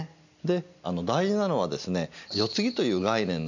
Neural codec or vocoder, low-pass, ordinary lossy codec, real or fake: none; 7.2 kHz; none; real